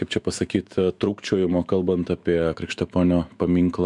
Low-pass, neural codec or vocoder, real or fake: 10.8 kHz; autoencoder, 48 kHz, 128 numbers a frame, DAC-VAE, trained on Japanese speech; fake